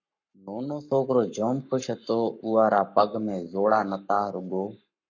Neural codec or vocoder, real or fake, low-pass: codec, 44.1 kHz, 7.8 kbps, Pupu-Codec; fake; 7.2 kHz